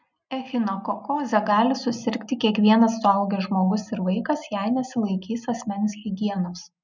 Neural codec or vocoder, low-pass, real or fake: none; 7.2 kHz; real